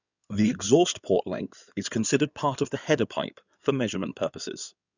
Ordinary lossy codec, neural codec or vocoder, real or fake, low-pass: none; codec, 16 kHz in and 24 kHz out, 2.2 kbps, FireRedTTS-2 codec; fake; 7.2 kHz